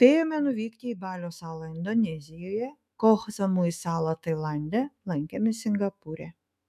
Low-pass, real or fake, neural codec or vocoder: 14.4 kHz; fake; autoencoder, 48 kHz, 128 numbers a frame, DAC-VAE, trained on Japanese speech